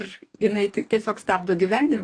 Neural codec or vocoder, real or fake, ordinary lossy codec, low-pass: codec, 44.1 kHz, 2.6 kbps, DAC; fake; AAC, 48 kbps; 9.9 kHz